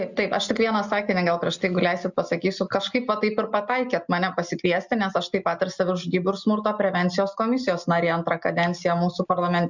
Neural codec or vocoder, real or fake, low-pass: none; real; 7.2 kHz